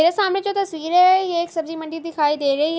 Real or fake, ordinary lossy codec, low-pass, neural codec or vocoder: real; none; none; none